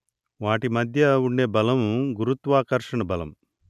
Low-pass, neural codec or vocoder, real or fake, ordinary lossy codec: 14.4 kHz; none; real; none